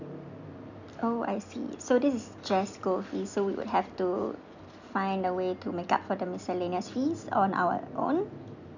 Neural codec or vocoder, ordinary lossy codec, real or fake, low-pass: none; none; real; 7.2 kHz